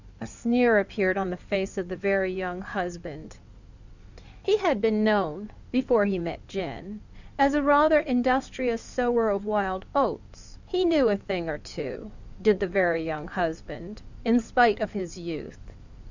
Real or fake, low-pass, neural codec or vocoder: fake; 7.2 kHz; codec, 16 kHz in and 24 kHz out, 2.2 kbps, FireRedTTS-2 codec